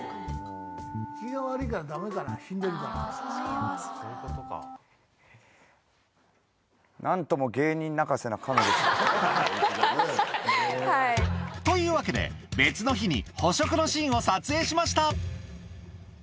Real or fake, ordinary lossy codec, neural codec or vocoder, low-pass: real; none; none; none